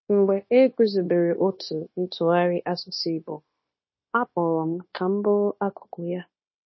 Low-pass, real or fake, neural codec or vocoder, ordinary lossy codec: 7.2 kHz; fake; codec, 16 kHz, 0.9 kbps, LongCat-Audio-Codec; MP3, 24 kbps